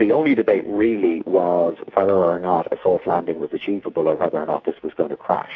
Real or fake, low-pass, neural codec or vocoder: fake; 7.2 kHz; codec, 44.1 kHz, 2.6 kbps, SNAC